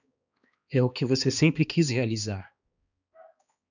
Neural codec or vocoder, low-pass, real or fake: codec, 16 kHz, 2 kbps, X-Codec, HuBERT features, trained on balanced general audio; 7.2 kHz; fake